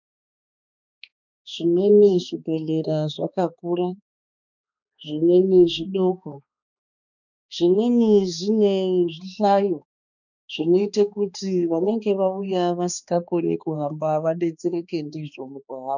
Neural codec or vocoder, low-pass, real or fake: codec, 16 kHz, 2 kbps, X-Codec, HuBERT features, trained on balanced general audio; 7.2 kHz; fake